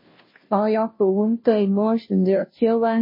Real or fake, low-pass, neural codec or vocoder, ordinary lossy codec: fake; 5.4 kHz; codec, 16 kHz, 0.5 kbps, FunCodec, trained on Chinese and English, 25 frames a second; MP3, 24 kbps